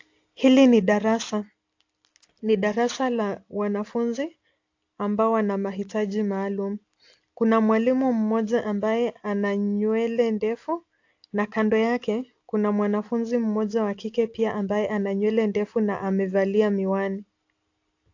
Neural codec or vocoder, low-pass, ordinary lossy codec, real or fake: none; 7.2 kHz; AAC, 48 kbps; real